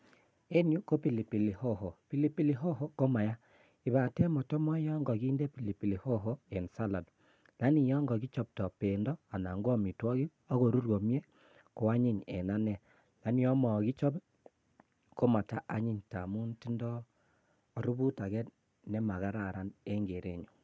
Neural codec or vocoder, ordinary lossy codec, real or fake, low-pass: none; none; real; none